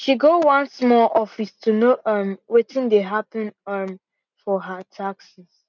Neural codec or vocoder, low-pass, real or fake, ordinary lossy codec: none; 7.2 kHz; real; none